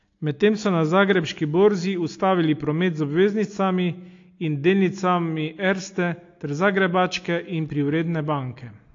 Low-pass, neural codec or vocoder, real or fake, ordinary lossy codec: 7.2 kHz; none; real; AAC, 48 kbps